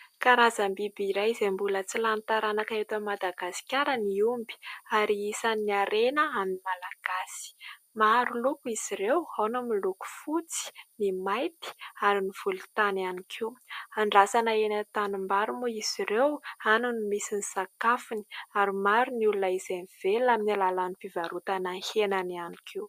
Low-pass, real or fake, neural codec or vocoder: 14.4 kHz; real; none